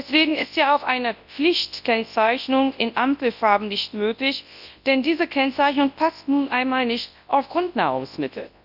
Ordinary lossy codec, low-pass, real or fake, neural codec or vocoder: none; 5.4 kHz; fake; codec, 24 kHz, 0.9 kbps, WavTokenizer, large speech release